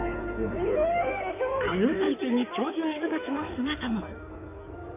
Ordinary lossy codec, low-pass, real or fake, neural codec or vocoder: MP3, 24 kbps; 3.6 kHz; fake; codec, 16 kHz in and 24 kHz out, 2.2 kbps, FireRedTTS-2 codec